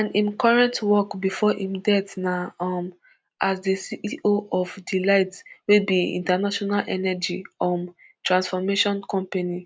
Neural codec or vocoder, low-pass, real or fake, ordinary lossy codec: none; none; real; none